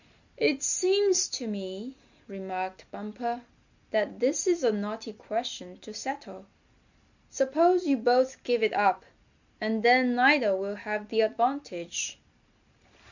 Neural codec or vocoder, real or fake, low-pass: none; real; 7.2 kHz